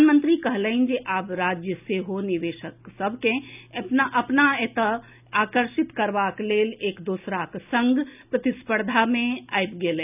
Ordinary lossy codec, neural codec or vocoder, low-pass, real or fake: none; none; 3.6 kHz; real